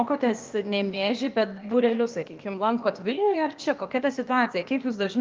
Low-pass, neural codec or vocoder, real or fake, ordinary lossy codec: 7.2 kHz; codec, 16 kHz, 0.8 kbps, ZipCodec; fake; Opus, 24 kbps